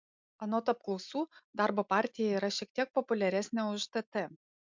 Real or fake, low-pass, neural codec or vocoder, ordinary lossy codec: real; 7.2 kHz; none; MP3, 64 kbps